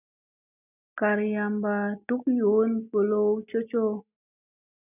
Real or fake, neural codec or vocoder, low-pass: real; none; 3.6 kHz